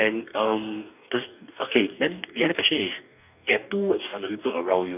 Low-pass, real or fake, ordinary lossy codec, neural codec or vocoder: 3.6 kHz; fake; none; codec, 44.1 kHz, 2.6 kbps, DAC